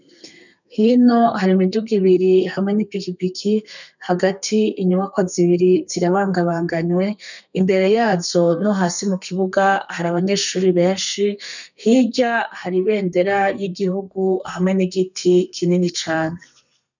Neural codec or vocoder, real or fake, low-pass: codec, 32 kHz, 1.9 kbps, SNAC; fake; 7.2 kHz